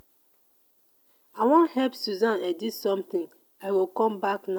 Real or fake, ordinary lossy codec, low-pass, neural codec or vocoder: real; none; none; none